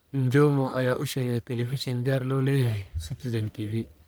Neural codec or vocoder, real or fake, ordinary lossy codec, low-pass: codec, 44.1 kHz, 1.7 kbps, Pupu-Codec; fake; none; none